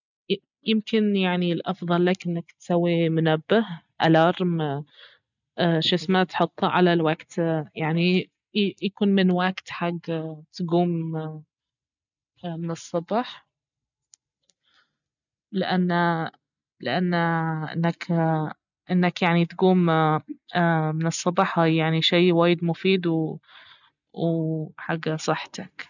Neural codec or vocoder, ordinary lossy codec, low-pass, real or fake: none; none; 7.2 kHz; real